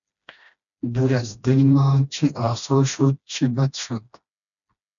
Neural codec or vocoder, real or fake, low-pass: codec, 16 kHz, 1 kbps, FreqCodec, smaller model; fake; 7.2 kHz